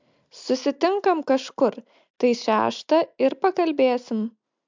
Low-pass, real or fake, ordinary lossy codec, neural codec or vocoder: 7.2 kHz; real; MP3, 64 kbps; none